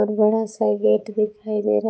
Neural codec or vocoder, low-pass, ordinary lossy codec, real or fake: codec, 16 kHz, 4 kbps, X-Codec, HuBERT features, trained on balanced general audio; none; none; fake